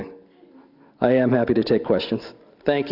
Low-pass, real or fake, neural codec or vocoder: 5.4 kHz; real; none